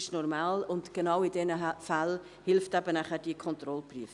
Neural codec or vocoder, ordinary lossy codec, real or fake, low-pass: none; none; real; 10.8 kHz